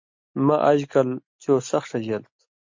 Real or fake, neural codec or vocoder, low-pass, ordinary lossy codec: real; none; 7.2 kHz; MP3, 48 kbps